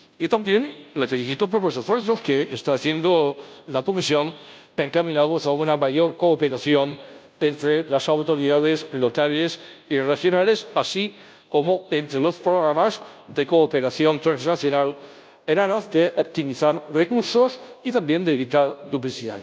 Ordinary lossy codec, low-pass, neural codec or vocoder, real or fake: none; none; codec, 16 kHz, 0.5 kbps, FunCodec, trained on Chinese and English, 25 frames a second; fake